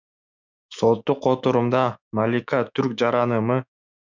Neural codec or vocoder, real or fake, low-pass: autoencoder, 48 kHz, 128 numbers a frame, DAC-VAE, trained on Japanese speech; fake; 7.2 kHz